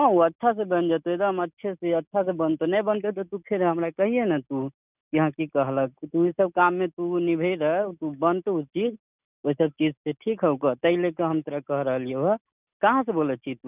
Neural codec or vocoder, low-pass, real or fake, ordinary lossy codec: none; 3.6 kHz; real; none